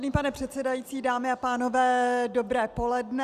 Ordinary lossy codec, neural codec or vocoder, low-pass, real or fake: Opus, 64 kbps; none; 14.4 kHz; real